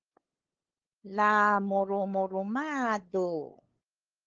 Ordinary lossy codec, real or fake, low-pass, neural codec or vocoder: Opus, 16 kbps; fake; 7.2 kHz; codec, 16 kHz, 8 kbps, FunCodec, trained on LibriTTS, 25 frames a second